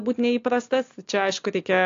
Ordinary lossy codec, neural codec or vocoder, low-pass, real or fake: AAC, 48 kbps; none; 7.2 kHz; real